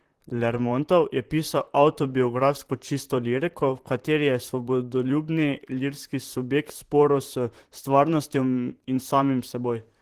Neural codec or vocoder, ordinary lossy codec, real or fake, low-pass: vocoder, 44.1 kHz, 128 mel bands, Pupu-Vocoder; Opus, 16 kbps; fake; 14.4 kHz